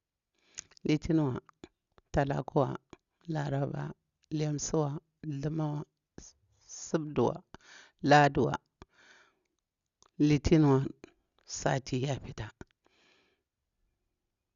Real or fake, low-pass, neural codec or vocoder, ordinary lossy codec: real; 7.2 kHz; none; Opus, 64 kbps